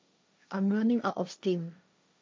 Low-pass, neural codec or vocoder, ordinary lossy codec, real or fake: none; codec, 16 kHz, 1.1 kbps, Voila-Tokenizer; none; fake